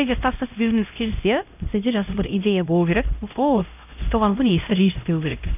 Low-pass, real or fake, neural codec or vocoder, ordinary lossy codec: 3.6 kHz; fake; codec, 16 kHz, 0.5 kbps, X-Codec, HuBERT features, trained on LibriSpeech; none